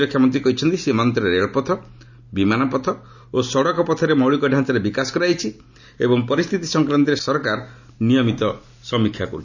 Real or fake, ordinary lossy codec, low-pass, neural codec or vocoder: real; none; 7.2 kHz; none